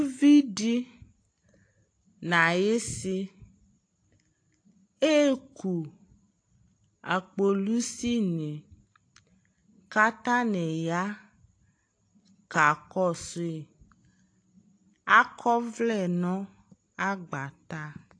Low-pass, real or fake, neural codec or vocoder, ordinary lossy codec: 9.9 kHz; real; none; AAC, 48 kbps